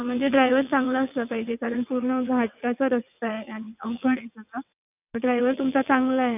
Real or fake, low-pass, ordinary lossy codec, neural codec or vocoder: fake; 3.6 kHz; none; vocoder, 22.05 kHz, 80 mel bands, WaveNeXt